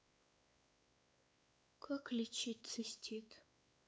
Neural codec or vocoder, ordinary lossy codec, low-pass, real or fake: codec, 16 kHz, 4 kbps, X-Codec, WavLM features, trained on Multilingual LibriSpeech; none; none; fake